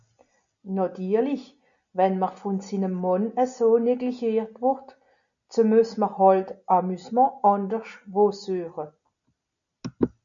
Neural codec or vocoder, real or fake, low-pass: none; real; 7.2 kHz